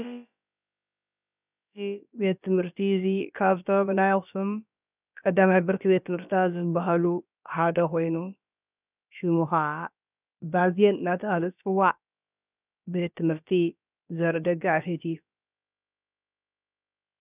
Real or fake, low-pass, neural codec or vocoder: fake; 3.6 kHz; codec, 16 kHz, about 1 kbps, DyCAST, with the encoder's durations